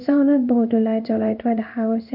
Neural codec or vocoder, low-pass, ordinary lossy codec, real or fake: codec, 16 kHz in and 24 kHz out, 1 kbps, XY-Tokenizer; 5.4 kHz; none; fake